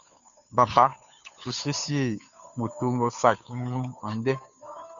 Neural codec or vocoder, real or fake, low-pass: codec, 16 kHz, 2 kbps, FunCodec, trained on Chinese and English, 25 frames a second; fake; 7.2 kHz